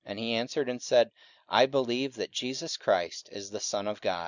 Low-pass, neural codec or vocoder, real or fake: 7.2 kHz; none; real